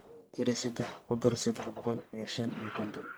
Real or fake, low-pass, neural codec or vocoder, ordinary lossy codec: fake; none; codec, 44.1 kHz, 1.7 kbps, Pupu-Codec; none